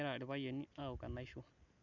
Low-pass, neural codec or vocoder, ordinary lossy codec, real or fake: 7.2 kHz; none; none; real